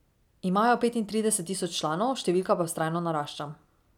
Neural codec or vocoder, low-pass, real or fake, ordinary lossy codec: none; 19.8 kHz; real; none